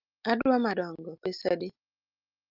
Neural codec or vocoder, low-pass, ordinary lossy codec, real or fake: none; 5.4 kHz; Opus, 32 kbps; real